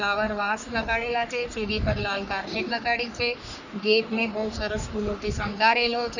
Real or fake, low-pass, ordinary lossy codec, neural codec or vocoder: fake; 7.2 kHz; none; codec, 44.1 kHz, 3.4 kbps, Pupu-Codec